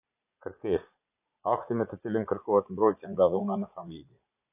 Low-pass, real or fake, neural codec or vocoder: 3.6 kHz; fake; vocoder, 44.1 kHz, 80 mel bands, Vocos